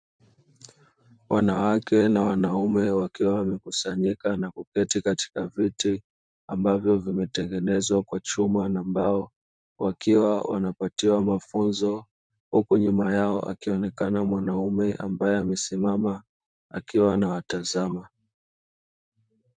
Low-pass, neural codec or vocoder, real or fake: 9.9 kHz; vocoder, 44.1 kHz, 128 mel bands, Pupu-Vocoder; fake